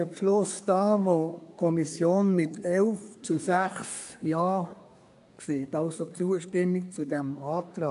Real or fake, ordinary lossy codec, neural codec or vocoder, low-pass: fake; AAC, 96 kbps; codec, 24 kHz, 1 kbps, SNAC; 10.8 kHz